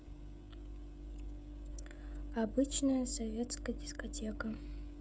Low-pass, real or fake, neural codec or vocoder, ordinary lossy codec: none; fake; codec, 16 kHz, 16 kbps, FreqCodec, smaller model; none